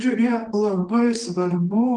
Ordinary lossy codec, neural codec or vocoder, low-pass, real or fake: Opus, 24 kbps; codec, 24 kHz, 0.9 kbps, WavTokenizer, medium music audio release; 10.8 kHz; fake